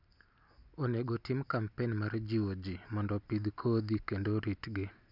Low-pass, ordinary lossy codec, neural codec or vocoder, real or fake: 5.4 kHz; none; none; real